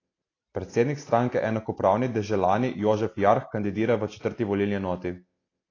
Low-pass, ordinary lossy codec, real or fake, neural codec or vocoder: 7.2 kHz; AAC, 32 kbps; real; none